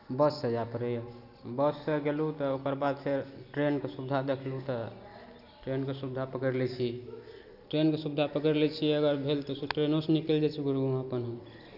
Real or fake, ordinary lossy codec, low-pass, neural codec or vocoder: real; none; 5.4 kHz; none